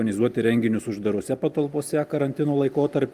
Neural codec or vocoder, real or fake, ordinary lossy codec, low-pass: none; real; Opus, 32 kbps; 14.4 kHz